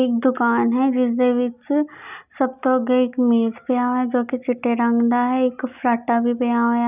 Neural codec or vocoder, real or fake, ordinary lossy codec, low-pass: none; real; none; 3.6 kHz